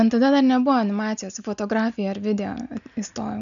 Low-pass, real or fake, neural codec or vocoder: 7.2 kHz; real; none